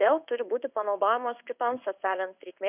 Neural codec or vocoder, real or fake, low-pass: codec, 16 kHz in and 24 kHz out, 1 kbps, XY-Tokenizer; fake; 3.6 kHz